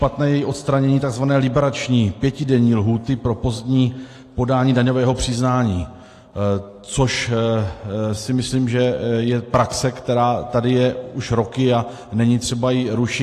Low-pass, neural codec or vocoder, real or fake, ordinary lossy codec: 14.4 kHz; none; real; AAC, 48 kbps